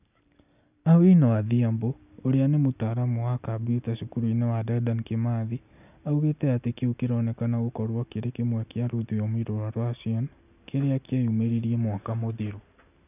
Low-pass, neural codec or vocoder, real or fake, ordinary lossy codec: 3.6 kHz; none; real; none